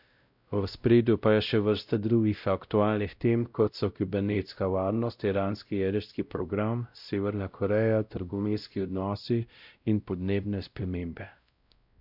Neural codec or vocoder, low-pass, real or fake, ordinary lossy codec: codec, 16 kHz, 0.5 kbps, X-Codec, WavLM features, trained on Multilingual LibriSpeech; 5.4 kHz; fake; none